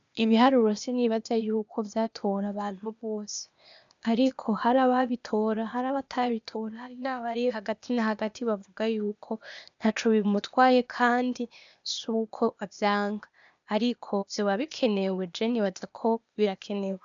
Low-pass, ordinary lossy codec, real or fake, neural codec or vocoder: 7.2 kHz; MP3, 96 kbps; fake; codec, 16 kHz, 0.8 kbps, ZipCodec